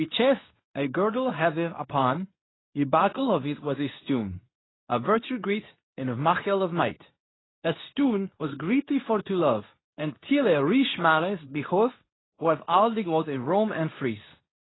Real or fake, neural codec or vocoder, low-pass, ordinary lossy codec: fake; codec, 24 kHz, 0.9 kbps, WavTokenizer, medium speech release version 2; 7.2 kHz; AAC, 16 kbps